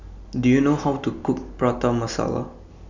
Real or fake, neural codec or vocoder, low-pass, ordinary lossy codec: real; none; 7.2 kHz; none